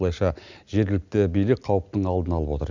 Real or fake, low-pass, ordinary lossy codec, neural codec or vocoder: real; 7.2 kHz; none; none